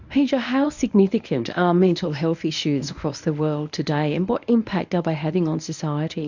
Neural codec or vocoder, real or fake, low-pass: codec, 24 kHz, 0.9 kbps, WavTokenizer, medium speech release version 1; fake; 7.2 kHz